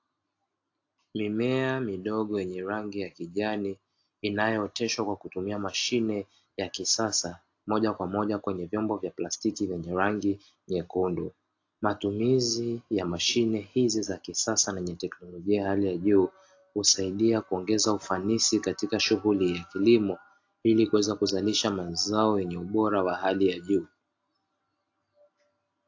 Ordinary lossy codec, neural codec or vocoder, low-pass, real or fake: AAC, 48 kbps; none; 7.2 kHz; real